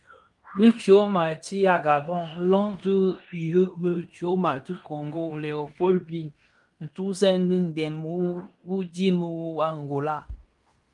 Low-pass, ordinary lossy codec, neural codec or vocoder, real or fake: 10.8 kHz; Opus, 32 kbps; codec, 16 kHz in and 24 kHz out, 0.9 kbps, LongCat-Audio-Codec, fine tuned four codebook decoder; fake